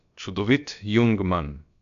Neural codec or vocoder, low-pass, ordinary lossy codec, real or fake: codec, 16 kHz, about 1 kbps, DyCAST, with the encoder's durations; 7.2 kHz; none; fake